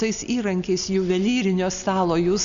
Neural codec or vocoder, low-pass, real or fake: none; 7.2 kHz; real